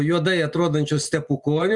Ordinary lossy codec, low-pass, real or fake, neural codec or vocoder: Opus, 64 kbps; 10.8 kHz; real; none